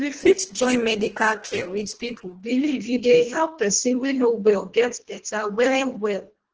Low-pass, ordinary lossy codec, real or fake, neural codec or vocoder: 7.2 kHz; Opus, 16 kbps; fake; codec, 24 kHz, 1.5 kbps, HILCodec